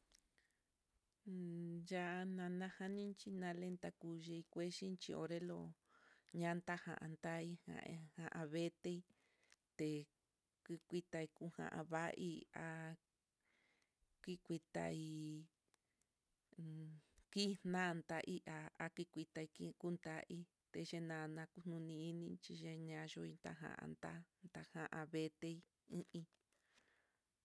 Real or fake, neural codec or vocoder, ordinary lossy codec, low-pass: real; none; none; none